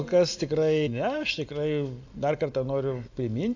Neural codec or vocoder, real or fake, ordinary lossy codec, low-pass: none; real; AAC, 48 kbps; 7.2 kHz